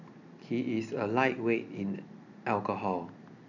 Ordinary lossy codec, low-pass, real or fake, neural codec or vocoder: none; 7.2 kHz; real; none